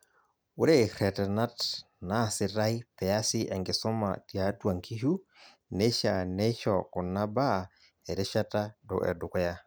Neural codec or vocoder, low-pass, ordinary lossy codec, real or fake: none; none; none; real